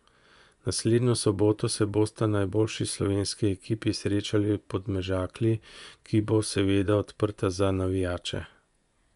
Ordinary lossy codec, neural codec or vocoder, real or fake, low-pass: none; vocoder, 24 kHz, 100 mel bands, Vocos; fake; 10.8 kHz